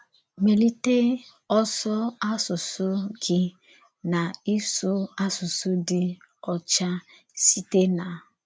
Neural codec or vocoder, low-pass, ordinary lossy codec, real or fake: none; none; none; real